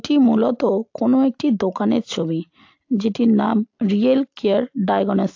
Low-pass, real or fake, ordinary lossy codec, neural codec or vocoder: 7.2 kHz; real; AAC, 48 kbps; none